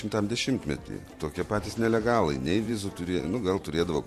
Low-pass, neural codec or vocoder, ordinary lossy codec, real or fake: 14.4 kHz; vocoder, 44.1 kHz, 128 mel bands every 512 samples, BigVGAN v2; MP3, 64 kbps; fake